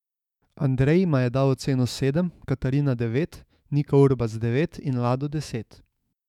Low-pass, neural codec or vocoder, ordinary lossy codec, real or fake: 19.8 kHz; autoencoder, 48 kHz, 128 numbers a frame, DAC-VAE, trained on Japanese speech; none; fake